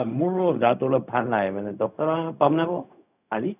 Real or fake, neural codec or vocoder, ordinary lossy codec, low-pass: fake; codec, 16 kHz, 0.4 kbps, LongCat-Audio-Codec; none; 3.6 kHz